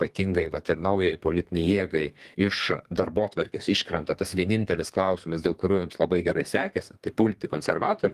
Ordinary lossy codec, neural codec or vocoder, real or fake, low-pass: Opus, 24 kbps; codec, 32 kHz, 1.9 kbps, SNAC; fake; 14.4 kHz